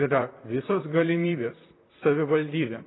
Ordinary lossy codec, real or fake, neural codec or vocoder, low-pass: AAC, 16 kbps; fake; vocoder, 44.1 kHz, 128 mel bands, Pupu-Vocoder; 7.2 kHz